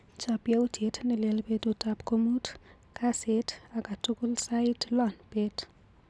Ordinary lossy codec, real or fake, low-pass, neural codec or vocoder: none; real; none; none